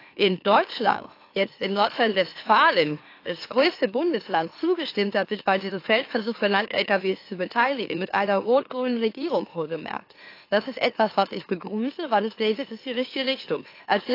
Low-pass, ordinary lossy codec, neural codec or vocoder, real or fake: 5.4 kHz; AAC, 32 kbps; autoencoder, 44.1 kHz, a latent of 192 numbers a frame, MeloTTS; fake